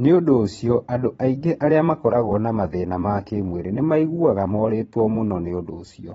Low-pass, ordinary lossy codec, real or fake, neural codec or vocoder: 9.9 kHz; AAC, 24 kbps; fake; vocoder, 22.05 kHz, 80 mel bands, Vocos